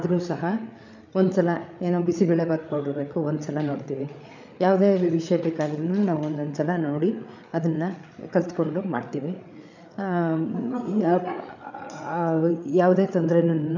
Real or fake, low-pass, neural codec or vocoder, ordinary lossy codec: fake; 7.2 kHz; codec, 16 kHz, 8 kbps, FreqCodec, larger model; none